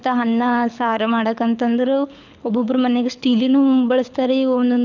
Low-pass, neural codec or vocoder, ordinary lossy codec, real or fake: 7.2 kHz; codec, 24 kHz, 6 kbps, HILCodec; none; fake